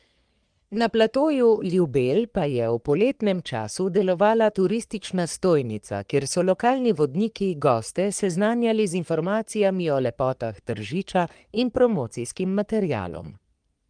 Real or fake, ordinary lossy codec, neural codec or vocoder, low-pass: fake; Opus, 32 kbps; codec, 44.1 kHz, 3.4 kbps, Pupu-Codec; 9.9 kHz